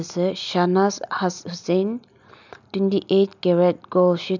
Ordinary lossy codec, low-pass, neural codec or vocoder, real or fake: none; 7.2 kHz; none; real